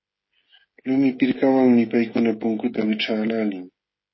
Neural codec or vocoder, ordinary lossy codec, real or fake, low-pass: codec, 16 kHz, 16 kbps, FreqCodec, smaller model; MP3, 24 kbps; fake; 7.2 kHz